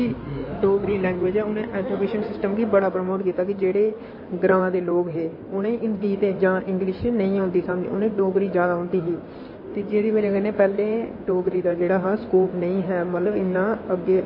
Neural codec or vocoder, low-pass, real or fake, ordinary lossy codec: codec, 16 kHz in and 24 kHz out, 2.2 kbps, FireRedTTS-2 codec; 5.4 kHz; fake; MP3, 24 kbps